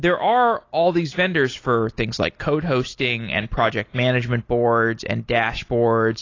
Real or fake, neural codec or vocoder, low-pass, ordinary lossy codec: real; none; 7.2 kHz; AAC, 32 kbps